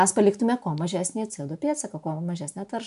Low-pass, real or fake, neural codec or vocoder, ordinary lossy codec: 10.8 kHz; real; none; AAC, 96 kbps